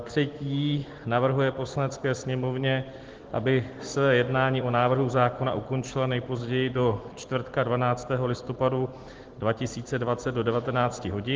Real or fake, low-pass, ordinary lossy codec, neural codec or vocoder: real; 7.2 kHz; Opus, 16 kbps; none